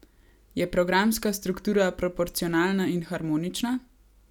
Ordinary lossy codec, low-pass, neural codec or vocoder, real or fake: none; 19.8 kHz; none; real